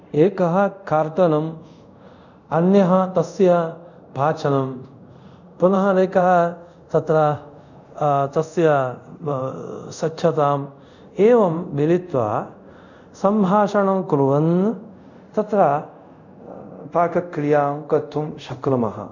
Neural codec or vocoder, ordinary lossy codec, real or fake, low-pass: codec, 24 kHz, 0.5 kbps, DualCodec; none; fake; 7.2 kHz